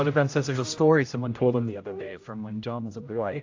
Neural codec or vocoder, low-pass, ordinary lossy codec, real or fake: codec, 16 kHz, 0.5 kbps, X-Codec, HuBERT features, trained on general audio; 7.2 kHz; AAC, 48 kbps; fake